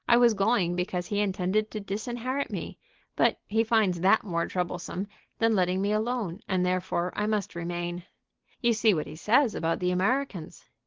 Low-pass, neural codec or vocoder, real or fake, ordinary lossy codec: 7.2 kHz; none; real; Opus, 16 kbps